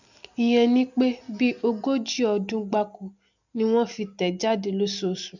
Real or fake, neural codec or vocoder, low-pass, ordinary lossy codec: real; none; 7.2 kHz; none